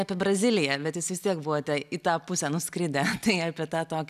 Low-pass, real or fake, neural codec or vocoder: 14.4 kHz; real; none